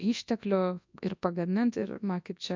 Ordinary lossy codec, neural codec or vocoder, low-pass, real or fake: MP3, 64 kbps; codec, 24 kHz, 0.9 kbps, WavTokenizer, large speech release; 7.2 kHz; fake